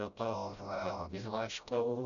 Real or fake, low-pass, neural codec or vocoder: fake; 7.2 kHz; codec, 16 kHz, 0.5 kbps, FreqCodec, smaller model